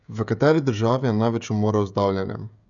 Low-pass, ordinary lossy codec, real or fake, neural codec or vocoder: 7.2 kHz; none; fake; codec, 16 kHz, 16 kbps, FreqCodec, smaller model